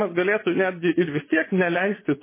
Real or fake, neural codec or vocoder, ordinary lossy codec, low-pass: fake; vocoder, 24 kHz, 100 mel bands, Vocos; MP3, 16 kbps; 3.6 kHz